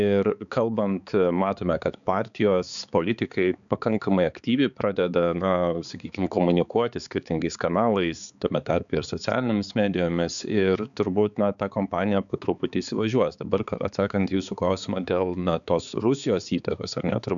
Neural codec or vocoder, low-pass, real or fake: codec, 16 kHz, 4 kbps, X-Codec, HuBERT features, trained on balanced general audio; 7.2 kHz; fake